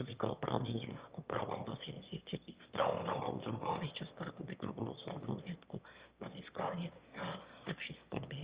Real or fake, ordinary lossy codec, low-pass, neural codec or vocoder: fake; Opus, 32 kbps; 3.6 kHz; autoencoder, 22.05 kHz, a latent of 192 numbers a frame, VITS, trained on one speaker